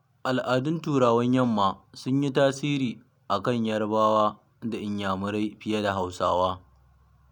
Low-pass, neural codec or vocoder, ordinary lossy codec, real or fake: 19.8 kHz; none; none; real